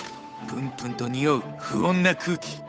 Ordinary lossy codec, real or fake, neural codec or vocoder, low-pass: none; fake; codec, 16 kHz, 8 kbps, FunCodec, trained on Chinese and English, 25 frames a second; none